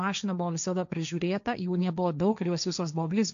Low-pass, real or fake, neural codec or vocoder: 7.2 kHz; fake; codec, 16 kHz, 1.1 kbps, Voila-Tokenizer